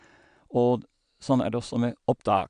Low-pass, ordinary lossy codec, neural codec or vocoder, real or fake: 9.9 kHz; AAC, 96 kbps; none; real